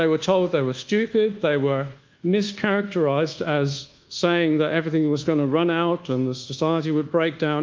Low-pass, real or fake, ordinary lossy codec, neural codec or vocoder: 7.2 kHz; fake; Opus, 32 kbps; codec, 24 kHz, 1.2 kbps, DualCodec